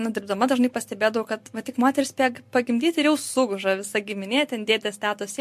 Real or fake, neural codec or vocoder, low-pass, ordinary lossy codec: real; none; 14.4 kHz; MP3, 64 kbps